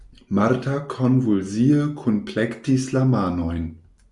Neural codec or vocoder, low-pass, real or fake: none; 10.8 kHz; real